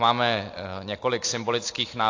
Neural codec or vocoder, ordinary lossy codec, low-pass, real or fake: none; AAC, 48 kbps; 7.2 kHz; real